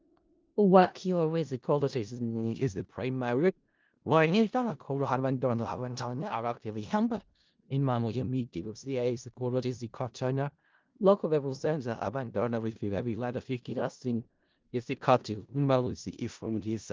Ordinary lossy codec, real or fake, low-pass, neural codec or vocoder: Opus, 32 kbps; fake; 7.2 kHz; codec, 16 kHz in and 24 kHz out, 0.4 kbps, LongCat-Audio-Codec, four codebook decoder